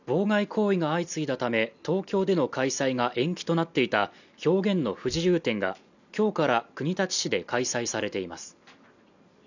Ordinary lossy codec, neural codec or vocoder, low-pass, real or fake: none; none; 7.2 kHz; real